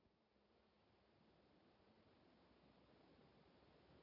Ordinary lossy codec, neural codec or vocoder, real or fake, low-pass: none; none; real; 5.4 kHz